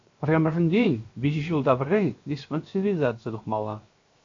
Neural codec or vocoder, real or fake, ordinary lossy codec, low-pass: codec, 16 kHz, 0.7 kbps, FocalCodec; fake; AAC, 48 kbps; 7.2 kHz